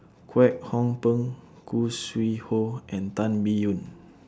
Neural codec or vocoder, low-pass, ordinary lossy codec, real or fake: none; none; none; real